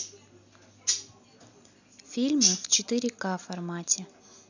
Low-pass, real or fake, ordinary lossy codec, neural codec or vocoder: 7.2 kHz; real; none; none